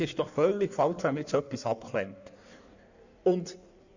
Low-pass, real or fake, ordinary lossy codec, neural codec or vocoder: 7.2 kHz; fake; none; codec, 16 kHz in and 24 kHz out, 1.1 kbps, FireRedTTS-2 codec